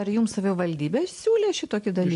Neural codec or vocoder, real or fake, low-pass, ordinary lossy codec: none; real; 10.8 kHz; AAC, 64 kbps